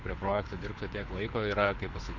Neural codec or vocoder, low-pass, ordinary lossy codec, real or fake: codec, 24 kHz, 6 kbps, HILCodec; 7.2 kHz; MP3, 48 kbps; fake